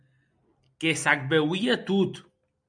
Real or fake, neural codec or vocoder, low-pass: real; none; 9.9 kHz